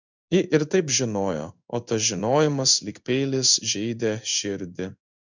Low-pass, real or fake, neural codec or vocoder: 7.2 kHz; fake; codec, 16 kHz in and 24 kHz out, 1 kbps, XY-Tokenizer